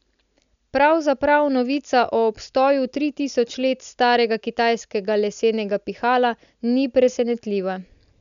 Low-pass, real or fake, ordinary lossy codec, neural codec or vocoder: 7.2 kHz; real; none; none